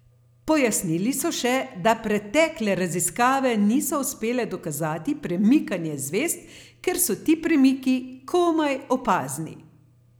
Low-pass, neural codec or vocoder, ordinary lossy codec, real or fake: none; none; none; real